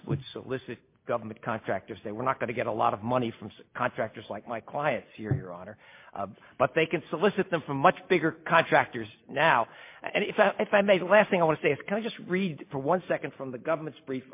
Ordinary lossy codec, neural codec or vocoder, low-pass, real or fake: MP3, 24 kbps; none; 3.6 kHz; real